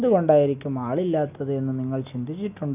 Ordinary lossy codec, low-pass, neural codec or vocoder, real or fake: none; 3.6 kHz; none; real